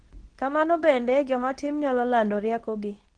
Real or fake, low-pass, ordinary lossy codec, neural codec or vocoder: fake; 9.9 kHz; Opus, 16 kbps; codec, 24 kHz, 0.9 kbps, WavTokenizer, medium speech release version 2